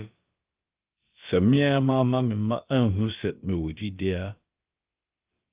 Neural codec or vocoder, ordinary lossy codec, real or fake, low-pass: codec, 16 kHz, about 1 kbps, DyCAST, with the encoder's durations; Opus, 32 kbps; fake; 3.6 kHz